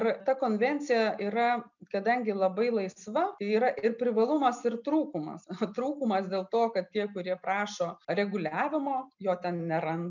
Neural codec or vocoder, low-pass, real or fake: none; 7.2 kHz; real